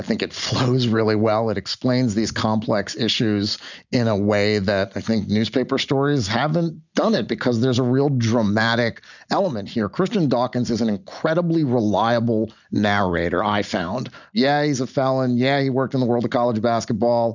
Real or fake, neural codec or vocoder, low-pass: real; none; 7.2 kHz